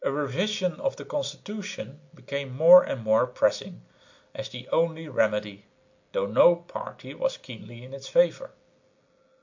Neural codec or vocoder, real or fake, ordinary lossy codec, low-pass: none; real; MP3, 48 kbps; 7.2 kHz